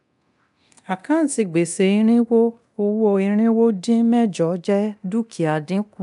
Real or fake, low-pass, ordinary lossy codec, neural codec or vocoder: fake; 10.8 kHz; none; codec, 24 kHz, 0.9 kbps, DualCodec